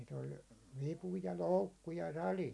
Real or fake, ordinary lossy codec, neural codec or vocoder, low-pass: real; none; none; none